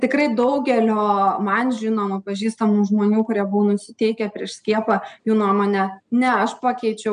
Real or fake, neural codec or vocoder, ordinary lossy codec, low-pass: real; none; MP3, 96 kbps; 9.9 kHz